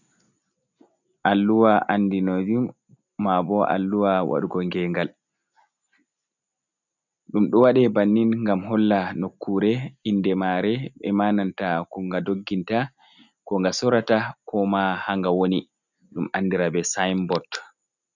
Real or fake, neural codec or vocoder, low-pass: real; none; 7.2 kHz